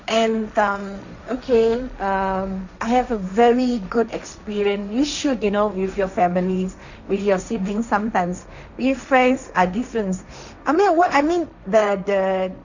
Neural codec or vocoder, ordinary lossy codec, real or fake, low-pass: codec, 16 kHz, 1.1 kbps, Voila-Tokenizer; none; fake; 7.2 kHz